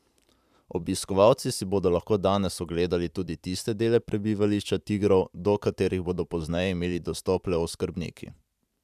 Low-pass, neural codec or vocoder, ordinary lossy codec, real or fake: 14.4 kHz; vocoder, 44.1 kHz, 128 mel bands every 512 samples, BigVGAN v2; none; fake